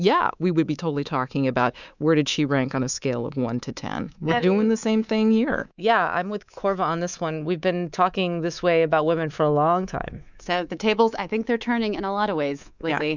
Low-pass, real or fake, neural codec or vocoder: 7.2 kHz; fake; codec, 24 kHz, 3.1 kbps, DualCodec